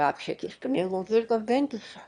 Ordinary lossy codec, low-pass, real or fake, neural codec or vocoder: none; 9.9 kHz; fake; autoencoder, 22.05 kHz, a latent of 192 numbers a frame, VITS, trained on one speaker